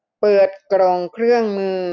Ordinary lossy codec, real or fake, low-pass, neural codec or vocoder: none; real; 7.2 kHz; none